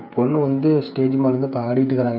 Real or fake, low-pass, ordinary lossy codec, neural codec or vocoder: fake; 5.4 kHz; none; codec, 16 kHz, 8 kbps, FreqCodec, smaller model